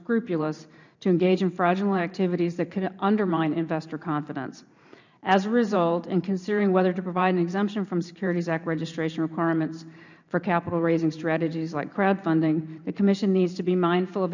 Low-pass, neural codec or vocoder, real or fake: 7.2 kHz; vocoder, 44.1 kHz, 128 mel bands every 512 samples, BigVGAN v2; fake